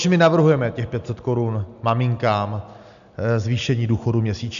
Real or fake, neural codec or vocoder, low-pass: real; none; 7.2 kHz